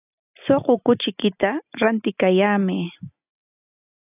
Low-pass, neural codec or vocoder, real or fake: 3.6 kHz; none; real